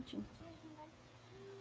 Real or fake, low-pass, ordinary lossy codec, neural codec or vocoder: fake; none; none; codec, 16 kHz, 16 kbps, FreqCodec, smaller model